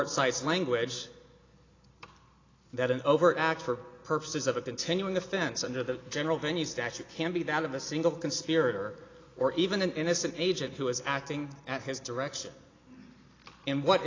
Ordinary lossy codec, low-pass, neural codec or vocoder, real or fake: AAC, 32 kbps; 7.2 kHz; none; real